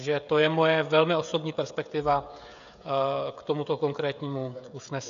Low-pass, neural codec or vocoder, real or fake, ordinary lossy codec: 7.2 kHz; codec, 16 kHz, 16 kbps, FreqCodec, smaller model; fake; AAC, 96 kbps